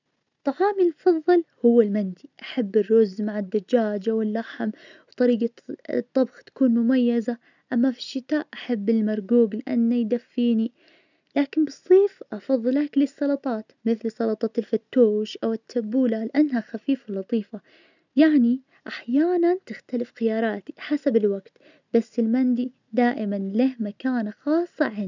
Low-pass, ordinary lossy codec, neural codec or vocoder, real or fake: 7.2 kHz; none; none; real